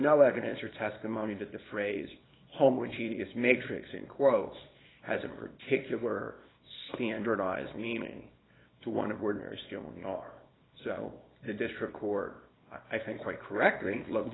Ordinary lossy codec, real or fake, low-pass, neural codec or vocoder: AAC, 16 kbps; fake; 7.2 kHz; codec, 24 kHz, 0.9 kbps, WavTokenizer, small release